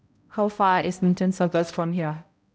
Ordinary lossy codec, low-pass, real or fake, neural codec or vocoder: none; none; fake; codec, 16 kHz, 0.5 kbps, X-Codec, HuBERT features, trained on balanced general audio